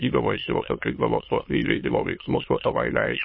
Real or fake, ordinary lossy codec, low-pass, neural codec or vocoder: fake; MP3, 24 kbps; 7.2 kHz; autoencoder, 22.05 kHz, a latent of 192 numbers a frame, VITS, trained on many speakers